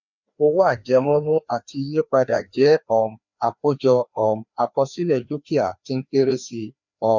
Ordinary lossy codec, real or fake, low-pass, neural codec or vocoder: none; fake; 7.2 kHz; codec, 16 kHz, 2 kbps, FreqCodec, larger model